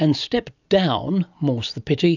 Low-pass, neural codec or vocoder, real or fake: 7.2 kHz; none; real